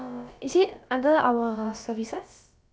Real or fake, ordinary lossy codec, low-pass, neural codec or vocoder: fake; none; none; codec, 16 kHz, about 1 kbps, DyCAST, with the encoder's durations